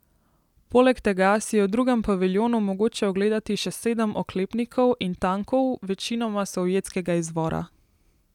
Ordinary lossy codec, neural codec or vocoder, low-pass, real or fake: none; none; 19.8 kHz; real